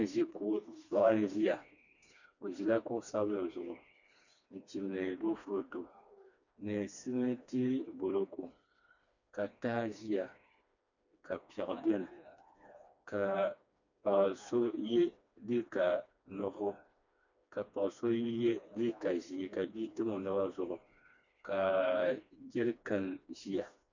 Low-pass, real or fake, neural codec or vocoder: 7.2 kHz; fake; codec, 16 kHz, 2 kbps, FreqCodec, smaller model